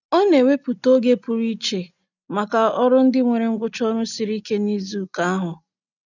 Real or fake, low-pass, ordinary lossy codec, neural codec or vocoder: real; 7.2 kHz; none; none